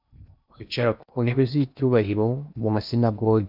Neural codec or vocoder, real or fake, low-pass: codec, 16 kHz in and 24 kHz out, 0.8 kbps, FocalCodec, streaming, 65536 codes; fake; 5.4 kHz